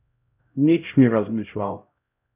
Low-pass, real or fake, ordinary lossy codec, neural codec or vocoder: 3.6 kHz; fake; none; codec, 16 kHz, 0.5 kbps, X-Codec, HuBERT features, trained on LibriSpeech